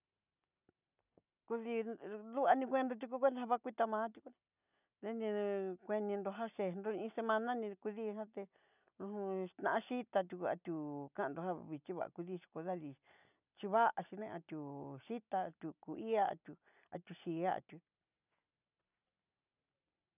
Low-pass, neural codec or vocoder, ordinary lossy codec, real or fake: 3.6 kHz; none; none; real